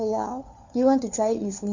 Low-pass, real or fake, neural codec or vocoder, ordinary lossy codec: 7.2 kHz; fake; codec, 16 kHz, 4 kbps, FunCodec, trained on LibriTTS, 50 frames a second; none